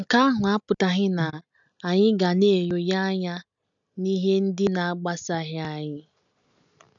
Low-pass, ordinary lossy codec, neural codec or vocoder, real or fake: 7.2 kHz; none; none; real